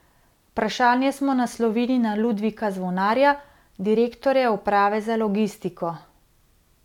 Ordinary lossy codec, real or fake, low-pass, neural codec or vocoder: none; real; 19.8 kHz; none